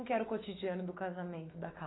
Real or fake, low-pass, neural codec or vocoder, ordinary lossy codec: fake; 7.2 kHz; autoencoder, 48 kHz, 128 numbers a frame, DAC-VAE, trained on Japanese speech; AAC, 16 kbps